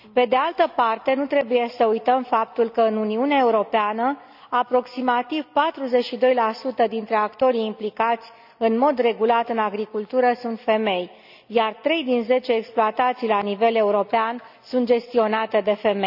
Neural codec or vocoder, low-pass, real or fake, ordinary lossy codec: none; 5.4 kHz; real; none